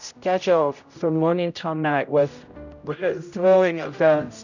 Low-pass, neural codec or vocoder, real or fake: 7.2 kHz; codec, 16 kHz, 0.5 kbps, X-Codec, HuBERT features, trained on general audio; fake